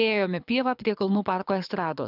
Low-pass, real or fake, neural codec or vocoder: 5.4 kHz; fake; codec, 24 kHz, 6 kbps, HILCodec